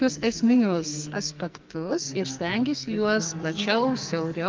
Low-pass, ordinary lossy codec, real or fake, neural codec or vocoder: 7.2 kHz; Opus, 24 kbps; fake; codec, 44.1 kHz, 2.6 kbps, SNAC